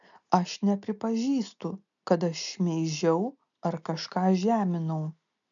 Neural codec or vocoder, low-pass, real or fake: none; 7.2 kHz; real